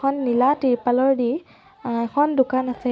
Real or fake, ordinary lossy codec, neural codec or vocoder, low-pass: real; none; none; none